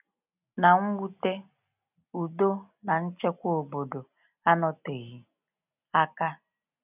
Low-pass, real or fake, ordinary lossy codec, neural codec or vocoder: 3.6 kHz; real; none; none